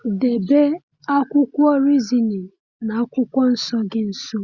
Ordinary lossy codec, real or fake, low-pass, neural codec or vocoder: Opus, 64 kbps; real; 7.2 kHz; none